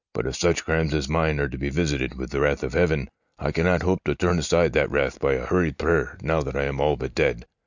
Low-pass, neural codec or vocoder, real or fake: 7.2 kHz; none; real